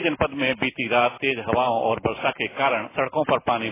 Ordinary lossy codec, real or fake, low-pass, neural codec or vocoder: AAC, 16 kbps; real; 3.6 kHz; none